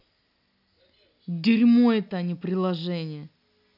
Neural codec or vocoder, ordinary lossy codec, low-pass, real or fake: none; none; 5.4 kHz; real